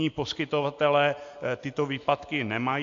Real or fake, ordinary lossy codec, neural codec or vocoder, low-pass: real; AAC, 48 kbps; none; 7.2 kHz